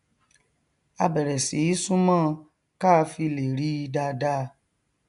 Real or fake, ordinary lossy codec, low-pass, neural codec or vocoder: real; none; 10.8 kHz; none